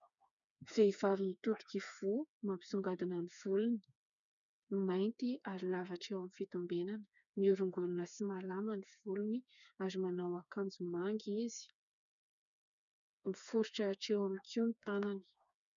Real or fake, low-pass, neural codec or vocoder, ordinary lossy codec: fake; 7.2 kHz; codec, 16 kHz, 4 kbps, FreqCodec, smaller model; MP3, 96 kbps